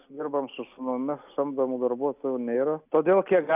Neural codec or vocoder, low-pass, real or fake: none; 3.6 kHz; real